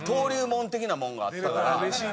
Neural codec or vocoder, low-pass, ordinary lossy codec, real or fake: none; none; none; real